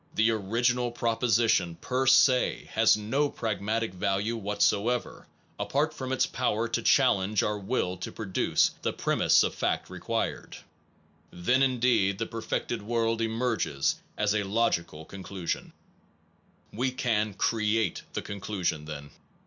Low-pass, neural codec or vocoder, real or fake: 7.2 kHz; none; real